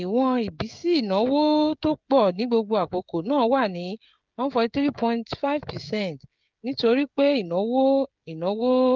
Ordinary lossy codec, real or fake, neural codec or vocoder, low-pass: Opus, 24 kbps; fake; codec, 16 kHz, 16 kbps, FreqCodec, smaller model; 7.2 kHz